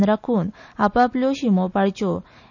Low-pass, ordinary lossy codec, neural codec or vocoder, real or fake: 7.2 kHz; none; none; real